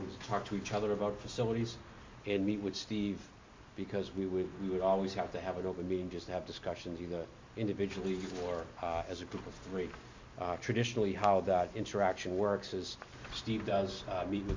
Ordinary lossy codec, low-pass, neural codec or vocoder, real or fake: MP3, 48 kbps; 7.2 kHz; none; real